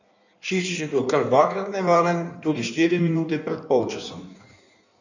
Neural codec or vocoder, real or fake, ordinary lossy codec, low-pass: codec, 16 kHz in and 24 kHz out, 1.1 kbps, FireRedTTS-2 codec; fake; none; 7.2 kHz